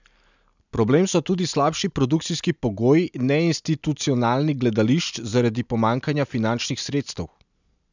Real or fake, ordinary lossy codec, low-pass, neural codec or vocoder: real; none; 7.2 kHz; none